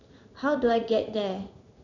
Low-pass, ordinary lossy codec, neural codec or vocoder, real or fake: 7.2 kHz; none; codec, 16 kHz in and 24 kHz out, 1 kbps, XY-Tokenizer; fake